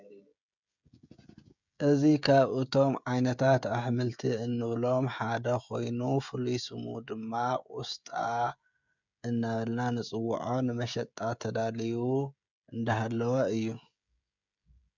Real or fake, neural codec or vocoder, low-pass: fake; codec, 16 kHz, 16 kbps, FreqCodec, smaller model; 7.2 kHz